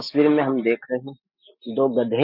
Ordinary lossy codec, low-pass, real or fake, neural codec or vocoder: none; 5.4 kHz; real; none